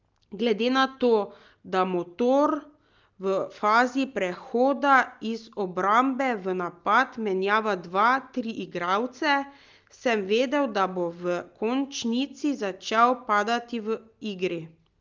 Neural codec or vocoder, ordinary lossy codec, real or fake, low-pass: none; Opus, 24 kbps; real; 7.2 kHz